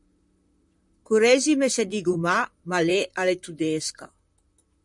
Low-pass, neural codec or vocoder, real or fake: 10.8 kHz; vocoder, 44.1 kHz, 128 mel bands, Pupu-Vocoder; fake